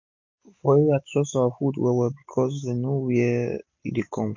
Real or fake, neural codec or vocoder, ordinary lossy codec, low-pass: real; none; MP3, 48 kbps; 7.2 kHz